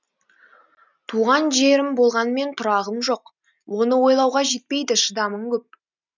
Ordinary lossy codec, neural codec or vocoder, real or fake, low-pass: none; none; real; 7.2 kHz